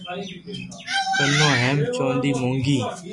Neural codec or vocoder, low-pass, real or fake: none; 10.8 kHz; real